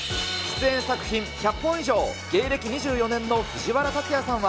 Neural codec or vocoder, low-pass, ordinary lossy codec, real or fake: none; none; none; real